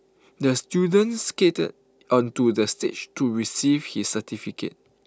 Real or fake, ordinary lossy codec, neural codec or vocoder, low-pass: real; none; none; none